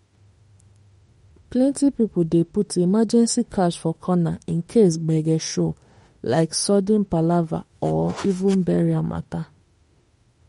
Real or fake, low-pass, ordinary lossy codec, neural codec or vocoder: fake; 19.8 kHz; MP3, 48 kbps; autoencoder, 48 kHz, 32 numbers a frame, DAC-VAE, trained on Japanese speech